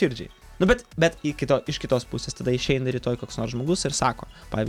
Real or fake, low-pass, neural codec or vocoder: real; 19.8 kHz; none